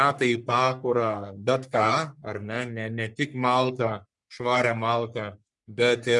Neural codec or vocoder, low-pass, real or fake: codec, 44.1 kHz, 3.4 kbps, Pupu-Codec; 10.8 kHz; fake